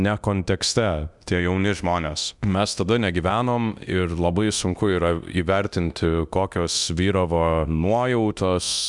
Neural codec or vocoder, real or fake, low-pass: codec, 24 kHz, 0.9 kbps, DualCodec; fake; 10.8 kHz